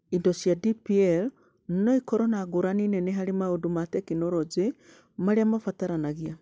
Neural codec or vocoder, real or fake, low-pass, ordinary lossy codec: none; real; none; none